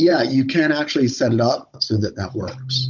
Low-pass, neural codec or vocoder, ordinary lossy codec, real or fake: 7.2 kHz; codec, 16 kHz, 16 kbps, FunCodec, trained on Chinese and English, 50 frames a second; MP3, 64 kbps; fake